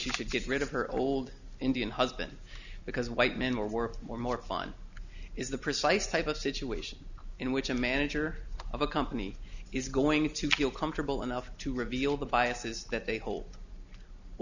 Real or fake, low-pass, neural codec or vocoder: real; 7.2 kHz; none